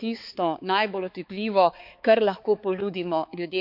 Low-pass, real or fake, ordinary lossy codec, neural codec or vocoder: 5.4 kHz; fake; none; codec, 16 kHz, 4 kbps, X-Codec, HuBERT features, trained on balanced general audio